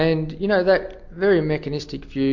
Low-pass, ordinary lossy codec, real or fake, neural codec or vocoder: 7.2 kHz; MP3, 48 kbps; real; none